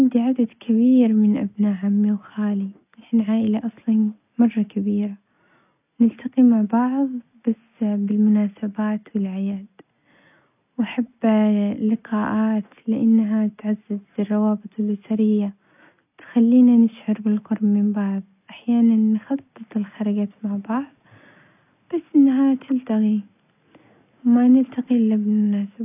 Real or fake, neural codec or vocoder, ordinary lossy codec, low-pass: real; none; none; 3.6 kHz